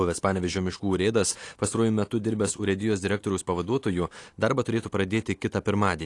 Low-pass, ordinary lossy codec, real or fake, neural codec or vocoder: 10.8 kHz; AAC, 48 kbps; real; none